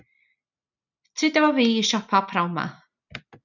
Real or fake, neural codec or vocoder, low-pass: real; none; 7.2 kHz